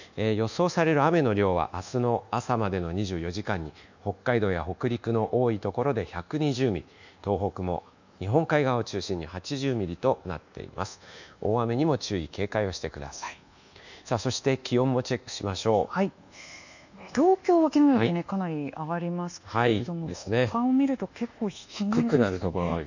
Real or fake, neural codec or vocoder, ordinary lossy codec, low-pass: fake; codec, 24 kHz, 1.2 kbps, DualCodec; none; 7.2 kHz